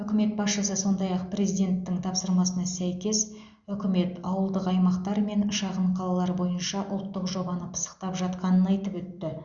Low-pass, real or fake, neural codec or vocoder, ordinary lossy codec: 7.2 kHz; real; none; Opus, 64 kbps